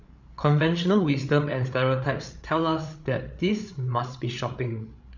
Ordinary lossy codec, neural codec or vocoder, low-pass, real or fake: none; codec, 16 kHz, 8 kbps, FreqCodec, larger model; 7.2 kHz; fake